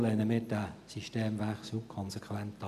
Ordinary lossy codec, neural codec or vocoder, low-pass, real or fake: none; none; 14.4 kHz; real